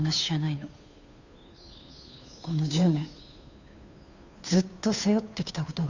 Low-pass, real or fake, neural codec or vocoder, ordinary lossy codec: 7.2 kHz; fake; codec, 16 kHz, 2 kbps, FunCodec, trained on Chinese and English, 25 frames a second; none